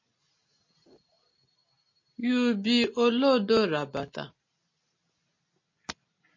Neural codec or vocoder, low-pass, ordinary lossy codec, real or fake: none; 7.2 kHz; MP3, 32 kbps; real